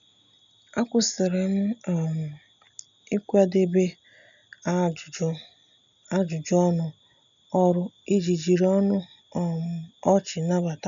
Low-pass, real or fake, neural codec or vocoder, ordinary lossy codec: 7.2 kHz; real; none; none